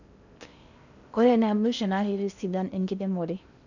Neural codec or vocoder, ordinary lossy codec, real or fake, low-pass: codec, 16 kHz in and 24 kHz out, 0.6 kbps, FocalCodec, streaming, 4096 codes; none; fake; 7.2 kHz